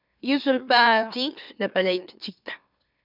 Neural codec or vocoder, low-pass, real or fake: autoencoder, 44.1 kHz, a latent of 192 numbers a frame, MeloTTS; 5.4 kHz; fake